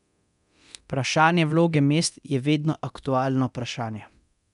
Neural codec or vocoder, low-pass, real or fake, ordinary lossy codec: codec, 24 kHz, 0.9 kbps, DualCodec; 10.8 kHz; fake; none